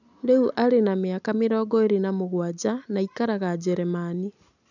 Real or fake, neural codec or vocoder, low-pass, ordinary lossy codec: real; none; 7.2 kHz; none